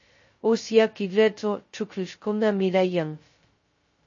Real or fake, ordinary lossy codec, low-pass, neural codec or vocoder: fake; MP3, 32 kbps; 7.2 kHz; codec, 16 kHz, 0.2 kbps, FocalCodec